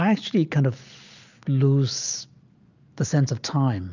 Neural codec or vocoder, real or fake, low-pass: none; real; 7.2 kHz